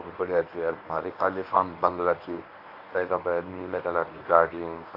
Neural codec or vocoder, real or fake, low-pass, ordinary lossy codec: codec, 24 kHz, 0.9 kbps, WavTokenizer, medium speech release version 1; fake; 5.4 kHz; AAC, 32 kbps